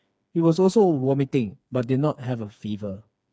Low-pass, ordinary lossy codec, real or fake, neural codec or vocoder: none; none; fake; codec, 16 kHz, 4 kbps, FreqCodec, smaller model